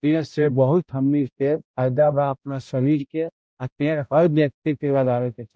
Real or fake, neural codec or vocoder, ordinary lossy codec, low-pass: fake; codec, 16 kHz, 0.5 kbps, X-Codec, HuBERT features, trained on balanced general audio; none; none